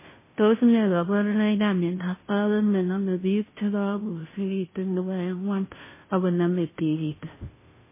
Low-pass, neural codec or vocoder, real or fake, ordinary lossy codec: 3.6 kHz; codec, 16 kHz, 0.5 kbps, FunCodec, trained on Chinese and English, 25 frames a second; fake; MP3, 16 kbps